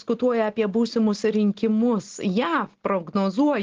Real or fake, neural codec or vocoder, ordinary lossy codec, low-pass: real; none; Opus, 16 kbps; 7.2 kHz